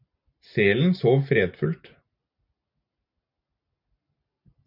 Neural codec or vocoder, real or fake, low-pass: none; real; 5.4 kHz